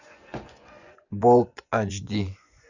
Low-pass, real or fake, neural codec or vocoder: 7.2 kHz; fake; vocoder, 24 kHz, 100 mel bands, Vocos